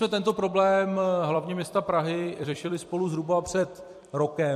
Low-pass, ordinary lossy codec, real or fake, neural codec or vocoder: 14.4 kHz; MP3, 64 kbps; fake; vocoder, 44.1 kHz, 128 mel bands every 256 samples, BigVGAN v2